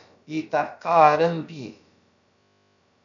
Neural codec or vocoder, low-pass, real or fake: codec, 16 kHz, about 1 kbps, DyCAST, with the encoder's durations; 7.2 kHz; fake